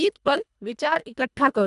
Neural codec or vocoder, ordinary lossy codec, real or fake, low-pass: codec, 24 kHz, 1.5 kbps, HILCodec; none; fake; 10.8 kHz